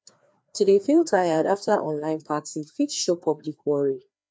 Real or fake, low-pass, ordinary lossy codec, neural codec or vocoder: fake; none; none; codec, 16 kHz, 2 kbps, FreqCodec, larger model